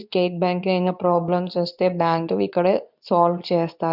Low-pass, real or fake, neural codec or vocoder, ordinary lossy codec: 5.4 kHz; fake; codec, 24 kHz, 0.9 kbps, WavTokenizer, medium speech release version 2; none